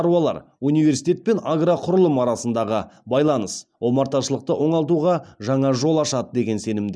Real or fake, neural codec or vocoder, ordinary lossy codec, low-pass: real; none; none; none